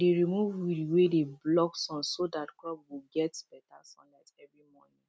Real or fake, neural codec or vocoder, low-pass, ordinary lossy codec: real; none; none; none